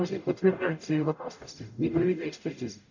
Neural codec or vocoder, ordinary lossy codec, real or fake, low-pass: codec, 44.1 kHz, 0.9 kbps, DAC; none; fake; 7.2 kHz